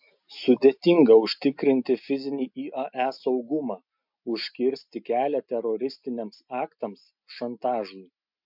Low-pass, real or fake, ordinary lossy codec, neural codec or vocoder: 5.4 kHz; real; MP3, 48 kbps; none